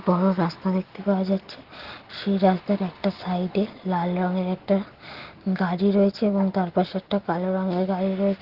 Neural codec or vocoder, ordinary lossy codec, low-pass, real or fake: none; Opus, 16 kbps; 5.4 kHz; real